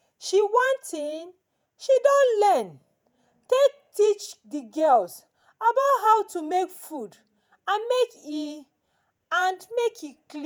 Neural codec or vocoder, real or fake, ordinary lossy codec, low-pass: vocoder, 48 kHz, 128 mel bands, Vocos; fake; none; none